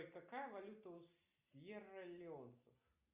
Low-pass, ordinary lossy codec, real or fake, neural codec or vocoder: 3.6 kHz; Opus, 64 kbps; real; none